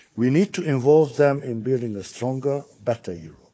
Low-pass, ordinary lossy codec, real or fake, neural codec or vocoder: none; none; fake; codec, 16 kHz, 4 kbps, FunCodec, trained on Chinese and English, 50 frames a second